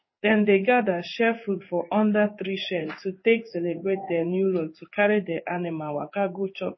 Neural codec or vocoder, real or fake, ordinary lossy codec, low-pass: codec, 16 kHz in and 24 kHz out, 1 kbps, XY-Tokenizer; fake; MP3, 24 kbps; 7.2 kHz